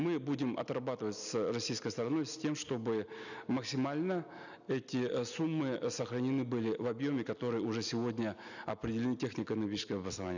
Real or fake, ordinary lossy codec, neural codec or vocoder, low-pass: real; none; none; 7.2 kHz